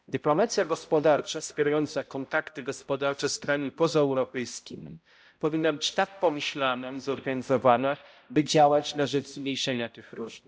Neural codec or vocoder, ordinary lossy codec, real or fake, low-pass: codec, 16 kHz, 0.5 kbps, X-Codec, HuBERT features, trained on balanced general audio; none; fake; none